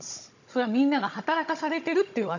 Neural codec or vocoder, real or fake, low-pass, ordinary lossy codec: codec, 16 kHz, 16 kbps, FunCodec, trained on Chinese and English, 50 frames a second; fake; 7.2 kHz; none